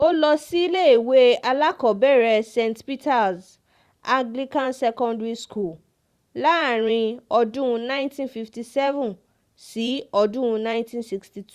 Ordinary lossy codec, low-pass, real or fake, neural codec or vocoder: Opus, 64 kbps; 14.4 kHz; fake; vocoder, 44.1 kHz, 128 mel bands every 512 samples, BigVGAN v2